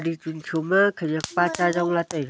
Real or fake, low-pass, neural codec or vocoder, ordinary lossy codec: real; none; none; none